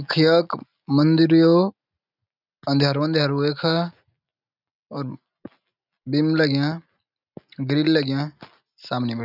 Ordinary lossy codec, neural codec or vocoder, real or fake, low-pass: none; none; real; 5.4 kHz